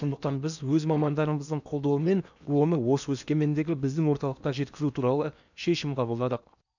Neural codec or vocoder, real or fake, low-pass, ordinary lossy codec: codec, 16 kHz in and 24 kHz out, 0.8 kbps, FocalCodec, streaming, 65536 codes; fake; 7.2 kHz; none